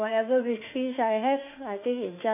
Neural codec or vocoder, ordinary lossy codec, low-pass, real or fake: autoencoder, 48 kHz, 32 numbers a frame, DAC-VAE, trained on Japanese speech; none; 3.6 kHz; fake